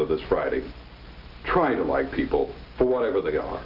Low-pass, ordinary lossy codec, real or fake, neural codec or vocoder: 5.4 kHz; Opus, 32 kbps; real; none